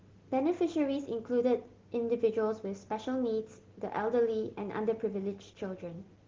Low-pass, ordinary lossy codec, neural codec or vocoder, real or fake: 7.2 kHz; Opus, 16 kbps; none; real